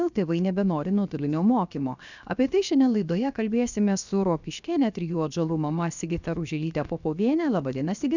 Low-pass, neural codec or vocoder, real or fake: 7.2 kHz; codec, 16 kHz, 0.7 kbps, FocalCodec; fake